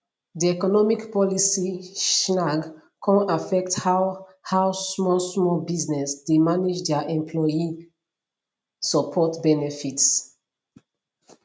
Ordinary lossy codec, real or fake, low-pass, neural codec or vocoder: none; real; none; none